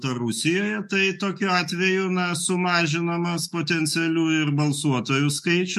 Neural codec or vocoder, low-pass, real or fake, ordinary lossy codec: autoencoder, 48 kHz, 128 numbers a frame, DAC-VAE, trained on Japanese speech; 14.4 kHz; fake; MP3, 64 kbps